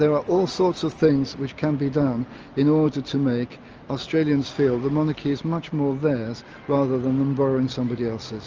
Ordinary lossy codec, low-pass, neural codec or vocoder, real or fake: Opus, 24 kbps; 7.2 kHz; none; real